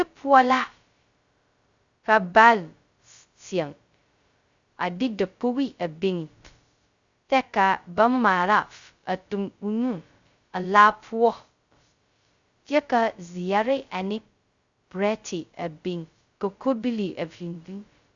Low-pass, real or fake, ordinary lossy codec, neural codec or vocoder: 7.2 kHz; fake; Opus, 64 kbps; codec, 16 kHz, 0.2 kbps, FocalCodec